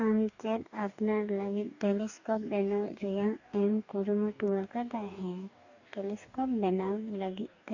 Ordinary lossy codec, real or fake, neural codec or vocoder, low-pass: none; fake; codec, 44.1 kHz, 2.6 kbps, DAC; 7.2 kHz